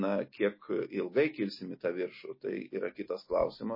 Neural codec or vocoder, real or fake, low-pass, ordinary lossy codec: none; real; 5.4 kHz; MP3, 24 kbps